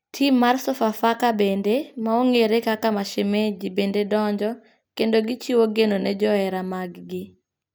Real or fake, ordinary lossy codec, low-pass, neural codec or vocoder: real; none; none; none